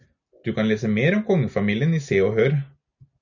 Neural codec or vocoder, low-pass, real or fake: none; 7.2 kHz; real